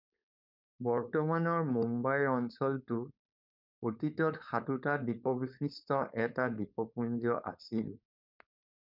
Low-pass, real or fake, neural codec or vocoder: 5.4 kHz; fake; codec, 16 kHz, 4.8 kbps, FACodec